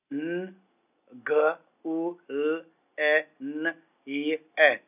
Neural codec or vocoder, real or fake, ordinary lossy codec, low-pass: none; real; none; 3.6 kHz